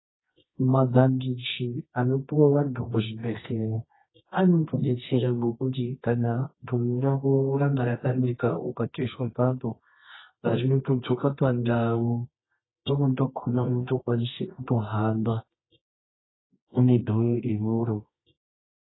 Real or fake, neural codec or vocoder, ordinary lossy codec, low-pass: fake; codec, 24 kHz, 0.9 kbps, WavTokenizer, medium music audio release; AAC, 16 kbps; 7.2 kHz